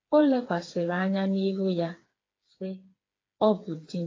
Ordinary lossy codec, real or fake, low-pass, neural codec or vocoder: AAC, 32 kbps; fake; 7.2 kHz; codec, 16 kHz, 4 kbps, FreqCodec, smaller model